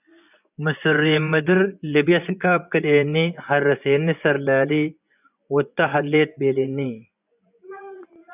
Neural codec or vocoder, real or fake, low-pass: vocoder, 24 kHz, 100 mel bands, Vocos; fake; 3.6 kHz